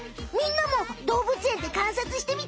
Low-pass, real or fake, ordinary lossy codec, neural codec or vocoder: none; real; none; none